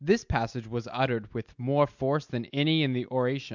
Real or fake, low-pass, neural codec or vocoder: real; 7.2 kHz; none